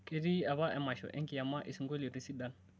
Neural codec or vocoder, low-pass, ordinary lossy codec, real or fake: none; none; none; real